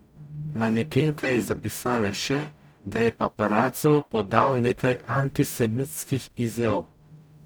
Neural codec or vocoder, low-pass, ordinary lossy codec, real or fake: codec, 44.1 kHz, 0.9 kbps, DAC; none; none; fake